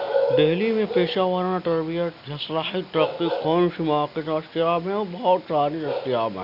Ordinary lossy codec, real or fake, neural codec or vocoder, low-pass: none; real; none; 5.4 kHz